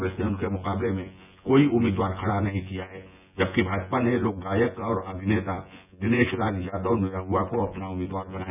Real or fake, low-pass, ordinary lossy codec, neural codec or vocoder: fake; 3.6 kHz; none; vocoder, 24 kHz, 100 mel bands, Vocos